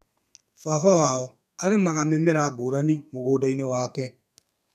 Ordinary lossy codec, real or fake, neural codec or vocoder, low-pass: none; fake; codec, 32 kHz, 1.9 kbps, SNAC; 14.4 kHz